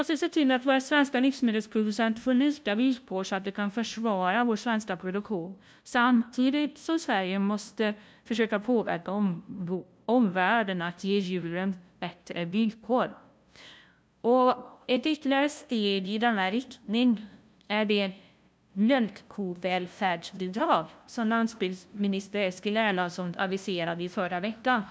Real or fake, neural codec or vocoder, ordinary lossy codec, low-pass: fake; codec, 16 kHz, 0.5 kbps, FunCodec, trained on LibriTTS, 25 frames a second; none; none